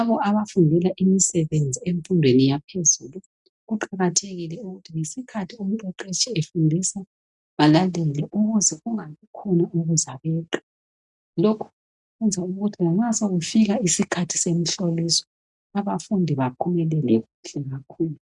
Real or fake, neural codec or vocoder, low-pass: real; none; 10.8 kHz